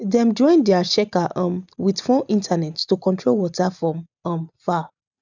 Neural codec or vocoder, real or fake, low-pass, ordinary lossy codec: none; real; 7.2 kHz; none